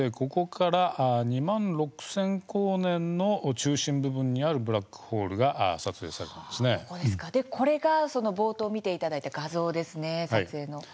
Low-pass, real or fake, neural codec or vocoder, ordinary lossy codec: none; real; none; none